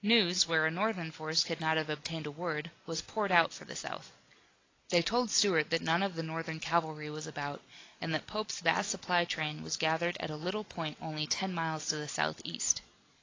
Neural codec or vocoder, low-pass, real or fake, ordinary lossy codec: none; 7.2 kHz; real; AAC, 32 kbps